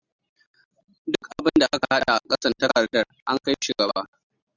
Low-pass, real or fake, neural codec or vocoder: 7.2 kHz; real; none